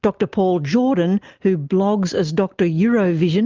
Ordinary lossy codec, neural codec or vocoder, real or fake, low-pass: Opus, 24 kbps; none; real; 7.2 kHz